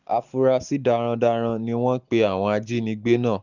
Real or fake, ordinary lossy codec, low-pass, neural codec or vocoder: real; none; 7.2 kHz; none